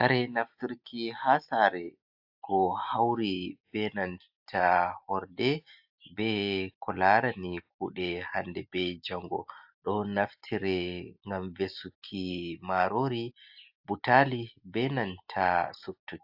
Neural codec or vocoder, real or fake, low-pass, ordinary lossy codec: none; real; 5.4 kHz; AAC, 48 kbps